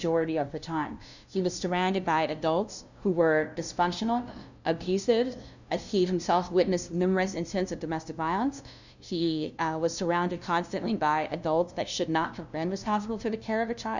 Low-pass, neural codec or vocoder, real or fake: 7.2 kHz; codec, 16 kHz, 0.5 kbps, FunCodec, trained on LibriTTS, 25 frames a second; fake